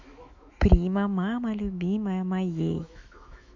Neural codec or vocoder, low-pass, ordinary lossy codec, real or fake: autoencoder, 48 kHz, 128 numbers a frame, DAC-VAE, trained on Japanese speech; 7.2 kHz; MP3, 64 kbps; fake